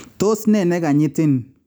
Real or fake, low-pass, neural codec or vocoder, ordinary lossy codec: real; none; none; none